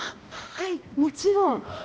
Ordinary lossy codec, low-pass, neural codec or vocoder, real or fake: none; none; codec, 16 kHz, 1 kbps, X-Codec, HuBERT features, trained on balanced general audio; fake